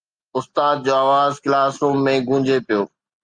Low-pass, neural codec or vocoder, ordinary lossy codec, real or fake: 9.9 kHz; none; Opus, 32 kbps; real